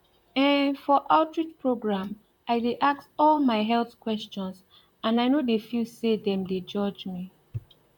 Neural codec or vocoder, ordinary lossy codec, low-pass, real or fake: vocoder, 44.1 kHz, 128 mel bands, Pupu-Vocoder; none; 19.8 kHz; fake